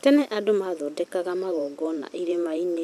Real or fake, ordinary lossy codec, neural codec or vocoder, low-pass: fake; none; vocoder, 44.1 kHz, 128 mel bands every 512 samples, BigVGAN v2; 14.4 kHz